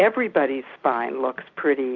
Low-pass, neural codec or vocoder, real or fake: 7.2 kHz; none; real